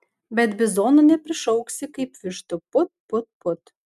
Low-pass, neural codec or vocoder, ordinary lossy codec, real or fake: 14.4 kHz; none; MP3, 96 kbps; real